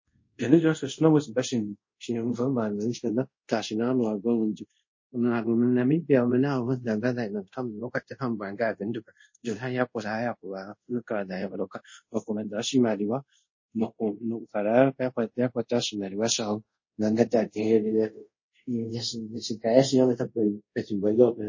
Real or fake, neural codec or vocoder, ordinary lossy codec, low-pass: fake; codec, 24 kHz, 0.5 kbps, DualCodec; MP3, 32 kbps; 7.2 kHz